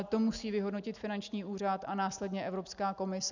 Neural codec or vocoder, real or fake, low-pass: none; real; 7.2 kHz